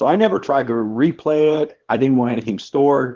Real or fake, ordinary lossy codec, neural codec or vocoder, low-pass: fake; Opus, 16 kbps; codec, 24 kHz, 0.9 kbps, WavTokenizer, small release; 7.2 kHz